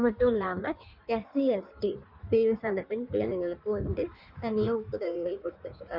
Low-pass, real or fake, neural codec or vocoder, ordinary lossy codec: 5.4 kHz; fake; codec, 16 kHz in and 24 kHz out, 1.1 kbps, FireRedTTS-2 codec; none